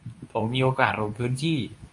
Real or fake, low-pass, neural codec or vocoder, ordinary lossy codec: fake; 10.8 kHz; codec, 24 kHz, 0.9 kbps, WavTokenizer, medium speech release version 2; MP3, 48 kbps